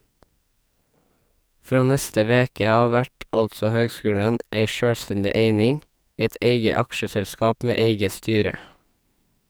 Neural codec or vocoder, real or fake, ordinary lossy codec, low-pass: codec, 44.1 kHz, 2.6 kbps, SNAC; fake; none; none